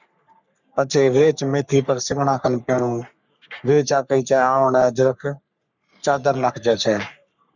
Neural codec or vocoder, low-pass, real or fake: codec, 44.1 kHz, 3.4 kbps, Pupu-Codec; 7.2 kHz; fake